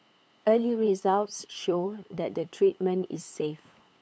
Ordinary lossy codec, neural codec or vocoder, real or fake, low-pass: none; codec, 16 kHz, 8 kbps, FunCodec, trained on LibriTTS, 25 frames a second; fake; none